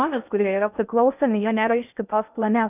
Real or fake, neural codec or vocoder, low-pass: fake; codec, 16 kHz in and 24 kHz out, 0.6 kbps, FocalCodec, streaming, 2048 codes; 3.6 kHz